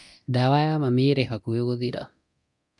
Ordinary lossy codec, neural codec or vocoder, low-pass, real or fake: none; codec, 24 kHz, 0.9 kbps, DualCodec; 10.8 kHz; fake